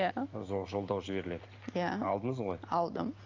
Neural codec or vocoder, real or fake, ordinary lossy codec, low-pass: none; real; Opus, 24 kbps; 7.2 kHz